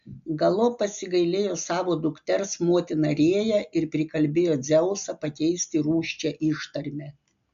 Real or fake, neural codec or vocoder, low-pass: real; none; 7.2 kHz